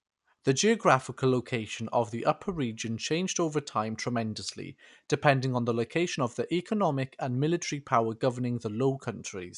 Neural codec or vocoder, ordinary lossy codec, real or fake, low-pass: none; none; real; 10.8 kHz